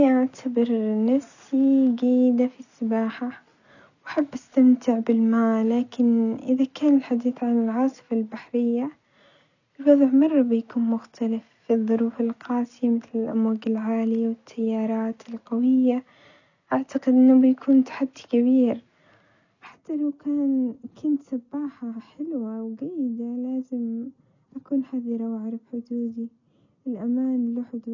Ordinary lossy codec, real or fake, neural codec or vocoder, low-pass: none; real; none; 7.2 kHz